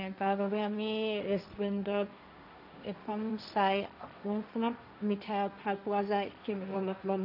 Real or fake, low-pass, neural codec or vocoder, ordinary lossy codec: fake; 5.4 kHz; codec, 16 kHz, 1.1 kbps, Voila-Tokenizer; none